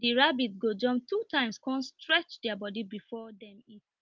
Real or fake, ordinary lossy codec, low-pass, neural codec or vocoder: real; Opus, 24 kbps; 7.2 kHz; none